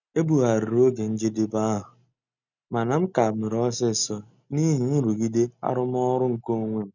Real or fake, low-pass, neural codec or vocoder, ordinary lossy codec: real; 7.2 kHz; none; none